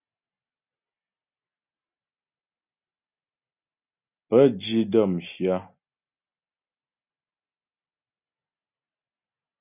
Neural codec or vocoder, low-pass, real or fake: none; 3.6 kHz; real